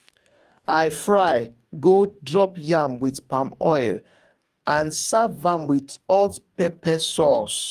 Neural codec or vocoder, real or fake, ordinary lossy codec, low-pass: codec, 44.1 kHz, 2.6 kbps, DAC; fake; Opus, 32 kbps; 14.4 kHz